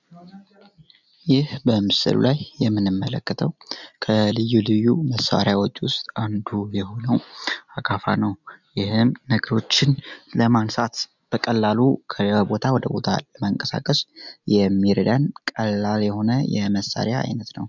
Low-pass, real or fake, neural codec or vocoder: 7.2 kHz; real; none